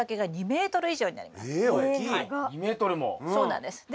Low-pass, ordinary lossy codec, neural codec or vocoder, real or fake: none; none; none; real